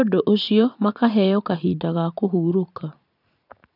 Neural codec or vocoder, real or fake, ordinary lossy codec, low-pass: vocoder, 44.1 kHz, 80 mel bands, Vocos; fake; none; 5.4 kHz